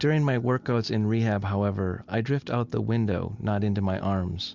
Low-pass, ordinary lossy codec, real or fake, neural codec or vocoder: 7.2 kHz; Opus, 64 kbps; real; none